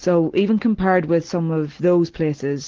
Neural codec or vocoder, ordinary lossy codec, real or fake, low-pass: none; Opus, 16 kbps; real; 7.2 kHz